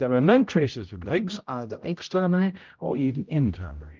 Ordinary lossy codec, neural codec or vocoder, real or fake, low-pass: Opus, 24 kbps; codec, 16 kHz, 0.5 kbps, X-Codec, HuBERT features, trained on general audio; fake; 7.2 kHz